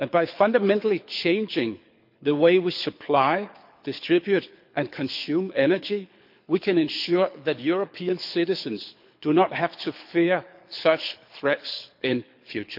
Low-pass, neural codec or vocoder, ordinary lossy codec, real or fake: 5.4 kHz; codec, 24 kHz, 6 kbps, HILCodec; MP3, 48 kbps; fake